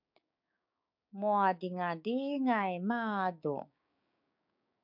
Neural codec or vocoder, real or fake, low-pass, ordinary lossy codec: codec, 16 kHz, 6 kbps, DAC; fake; 5.4 kHz; MP3, 48 kbps